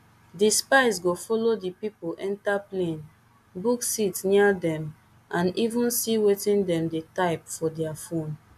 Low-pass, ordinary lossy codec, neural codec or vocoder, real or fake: 14.4 kHz; AAC, 96 kbps; none; real